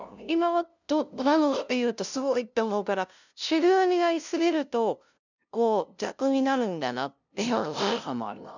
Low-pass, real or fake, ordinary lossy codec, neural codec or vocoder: 7.2 kHz; fake; none; codec, 16 kHz, 0.5 kbps, FunCodec, trained on LibriTTS, 25 frames a second